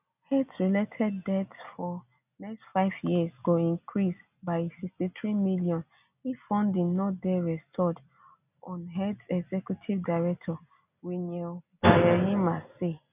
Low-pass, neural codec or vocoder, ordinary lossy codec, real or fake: 3.6 kHz; none; none; real